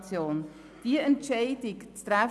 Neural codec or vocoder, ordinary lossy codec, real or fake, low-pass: none; none; real; none